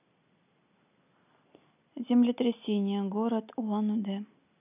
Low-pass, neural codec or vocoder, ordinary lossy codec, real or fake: 3.6 kHz; none; none; real